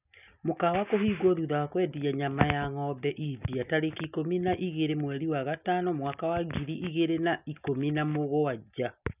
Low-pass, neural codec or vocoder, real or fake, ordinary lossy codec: 3.6 kHz; none; real; none